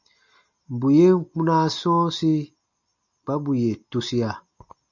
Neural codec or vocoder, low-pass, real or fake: none; 7.2 kHz; real